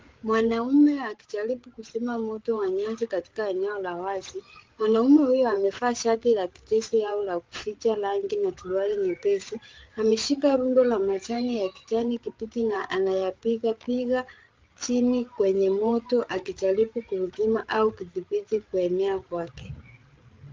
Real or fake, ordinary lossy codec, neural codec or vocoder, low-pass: fake; Opus, 32 kbps; codec, 16 kHz, 8 kbps, FreqCodec, larger model; 7.2 kHz